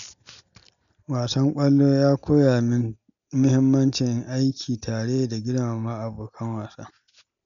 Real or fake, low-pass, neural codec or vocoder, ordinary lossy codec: real; 7.2 kHz; none; none